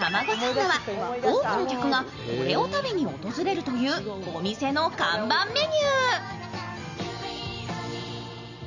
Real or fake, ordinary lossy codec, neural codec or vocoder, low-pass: real; none; none; 7.2 kHz